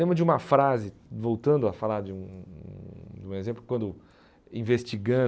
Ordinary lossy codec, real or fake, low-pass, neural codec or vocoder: none; real; none; none